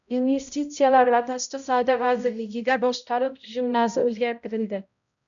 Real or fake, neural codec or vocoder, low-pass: fake; codec, 16 kHz, 0.5 kbps, X-Codec, HuBERT features, trained on balanced general audio; 7.2 kHz